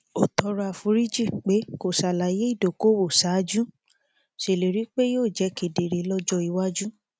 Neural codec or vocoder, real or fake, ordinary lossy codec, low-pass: none; real; none; none